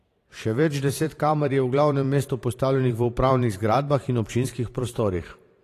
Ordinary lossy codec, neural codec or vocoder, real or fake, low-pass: AAC, 48 kbps; vocoder, 44.1 kHz, 128 mel bands every 512 samples, BigVGAN v2; fake; 14.4 kHz